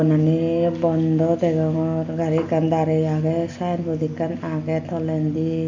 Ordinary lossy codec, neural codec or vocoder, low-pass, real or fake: MP3, 64 kbps; none; 7.2 kHz; real